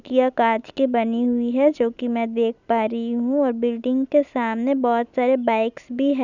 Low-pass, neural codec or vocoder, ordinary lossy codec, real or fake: 7.2 kHz; none; none; real